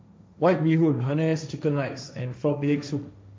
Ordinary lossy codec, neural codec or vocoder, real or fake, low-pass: none; codec, 16 kHz, 1.1 kbps, Voila-Tokenizer; fake; none